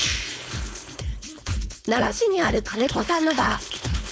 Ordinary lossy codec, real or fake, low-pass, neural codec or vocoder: none; fake; none; codec, 16 kHz, 4.8 kbps, FACodec